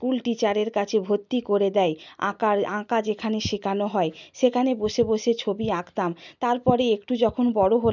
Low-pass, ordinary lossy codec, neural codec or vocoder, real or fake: 7.2 kHz; none; none; real